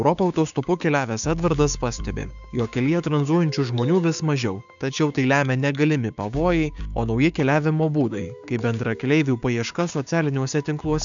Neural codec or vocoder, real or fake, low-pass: codec, 16 kHz, 6 kbps, DAC; fake; 7.2 kHz